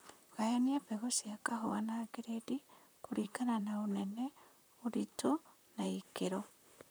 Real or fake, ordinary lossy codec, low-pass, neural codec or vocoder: fake; none; none; vocoder, 44.1 kHz, 128 mel bands, Pupu-Vocoder